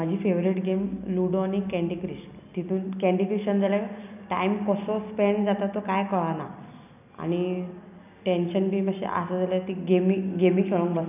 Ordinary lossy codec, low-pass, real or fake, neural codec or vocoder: none; 3.6 kHz; real; none